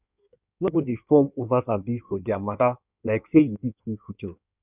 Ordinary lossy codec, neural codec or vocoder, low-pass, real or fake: none; codec, 16 kHz in and 24 kHz out, 1.1 kbps, FireRedTTS-2 codec; 3.6 kHz; fake